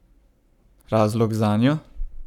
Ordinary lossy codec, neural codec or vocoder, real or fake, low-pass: none; codec, 44.1 kHz, 7.8 kbps, Pupu-Codec; fake; 19.8 kHz